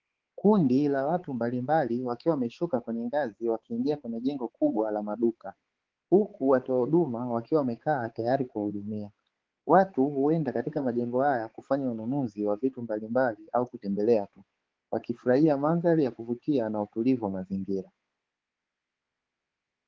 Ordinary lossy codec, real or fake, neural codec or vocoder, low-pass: Opus, 16 kbps; fake; codec, 16 kHz, 4 kbps, X-Codec, HuBERT features, trained on balanced general audio; 7.2 kHz